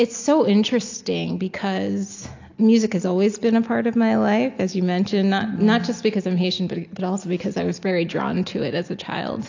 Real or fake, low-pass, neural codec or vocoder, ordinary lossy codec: real; 7.2 kHz; none; AAC, 48 kbps